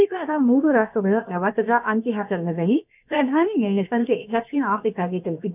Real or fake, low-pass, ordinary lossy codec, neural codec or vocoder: fake; 3.6 kHz; none; codec, 16 kHz, 0.7 kbps, FocalCodec